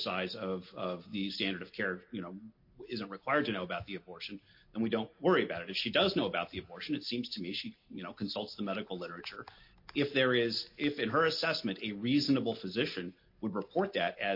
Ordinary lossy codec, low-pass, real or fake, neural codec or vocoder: MP3, 32 kbps; 5.4 kHz; real; none